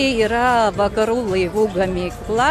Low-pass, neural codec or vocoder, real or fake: 14.4 kHz; none; real